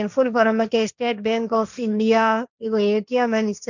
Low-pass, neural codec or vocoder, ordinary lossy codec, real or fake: 7.2 kHz; codec, 16 kHz, 1.1 kbps, Voila-Tokenizer; none; fake